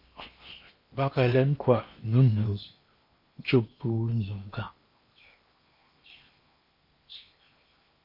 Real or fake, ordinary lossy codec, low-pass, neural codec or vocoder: fake; MP3, 32 kbps; 5.4 kHz; codec, 16 kHz in and 24 kHz out, 0.8 kbps, FocalCodec, streaming, 65536 codes